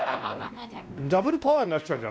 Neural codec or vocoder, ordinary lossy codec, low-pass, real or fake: codec, 16 kHz, 1 kbps, X-Codec, WavLM features, trained on Multilingual LibriSpeech; none; none; fake